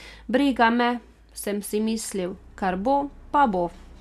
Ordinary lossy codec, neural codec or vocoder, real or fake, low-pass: none; none; real; 14.4 kHz